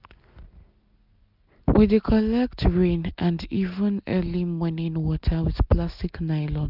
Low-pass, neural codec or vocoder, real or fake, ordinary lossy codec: 5.4 kHz; none; real; none